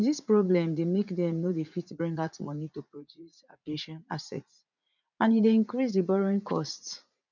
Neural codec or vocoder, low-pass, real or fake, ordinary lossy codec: vocoder, 44.1 kHz, 80 mel bands, Vocos; 7.2 kHz; fake; none